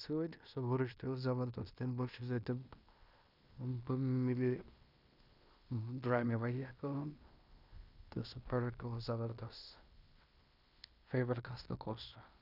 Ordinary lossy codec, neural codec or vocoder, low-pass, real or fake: none; codec, 16 kHz in and 24 kHz out, 0.9 kbps, LongCat-Audio-Codec, fine tuned four codebook decoder; 5.4 kHz; fake